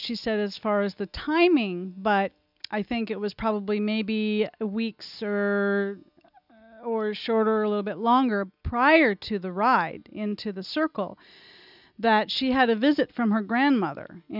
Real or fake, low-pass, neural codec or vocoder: real; 5.4 kHz; none